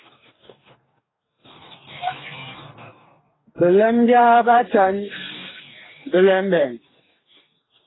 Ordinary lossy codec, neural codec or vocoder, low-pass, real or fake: AAC, 16 kbps; codec, 44.1 kHz, 2.6 kbps, DAC; 7.2 kHz; fake